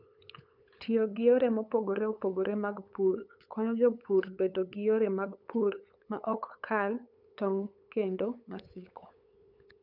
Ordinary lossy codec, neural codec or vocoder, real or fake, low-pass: none; codec, 16 kHz, 8 kbps, FunCodec, trained on LibriTTS, 25 frames a second; fake; 5.4 kHz